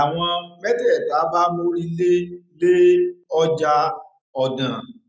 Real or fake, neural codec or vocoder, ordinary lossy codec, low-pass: real; none; none; none